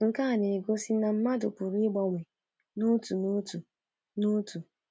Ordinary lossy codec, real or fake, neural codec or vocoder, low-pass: none; real; none; none